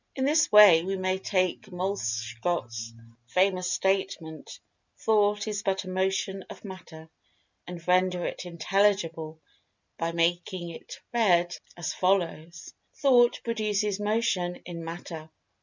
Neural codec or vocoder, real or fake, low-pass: none; real; 7.2 kHz